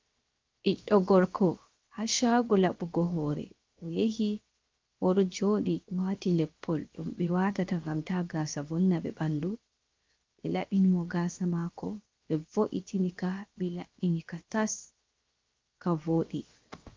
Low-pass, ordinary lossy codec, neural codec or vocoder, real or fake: 7.2 kHz; Opus, 32 kbps; codec, 16 kHz, 0.7 kbps, FocalCodec; fake